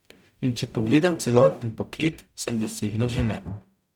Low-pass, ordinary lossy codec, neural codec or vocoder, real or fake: 19.8 kHz; none; codec, 44.1 kHz, 0.9 kbps, DAC; fake